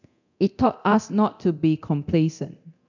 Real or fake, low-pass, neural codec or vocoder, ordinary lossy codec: fake; 7.2 kHz; codec, 24 kHz, 0.9 kbps, DualCodec; none